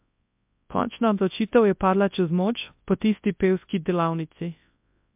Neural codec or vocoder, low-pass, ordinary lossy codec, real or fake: codec, 24 kHz, 0.9 kbps, WavTokenizer, large speech release; 3.6 kHz; MP3, 32 kbps; fake